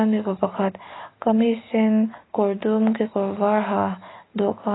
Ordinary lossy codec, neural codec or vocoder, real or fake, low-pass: AAC, 16 kbps; none; real; 7.2 kHz